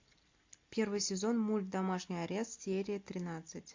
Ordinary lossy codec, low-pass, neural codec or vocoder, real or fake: MP3, 48 kbps; 7.2 kHz; none; real